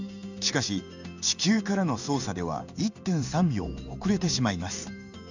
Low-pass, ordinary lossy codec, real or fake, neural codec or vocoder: 7.2 kHz; none; fake; codec, 16 kHz in and 24 kHz out, 1 kbps, XY-Tokenizer